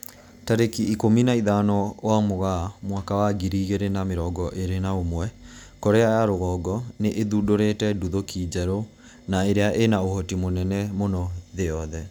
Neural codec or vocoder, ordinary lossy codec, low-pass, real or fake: none; none; none; real